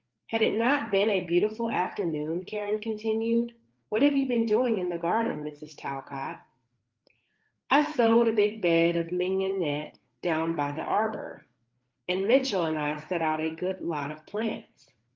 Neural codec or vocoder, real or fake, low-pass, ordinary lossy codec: codec, 16 kHz, 4 kbps, FreqCodec, larger model; fake; 7.2 kHz; Opus, 32 kbps